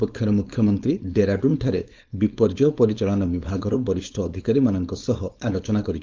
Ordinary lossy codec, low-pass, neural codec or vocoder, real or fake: Opus, 24 kbps; 7.2 kHz; codec, 16 kHz, 4.8 kbps, FACodec; fake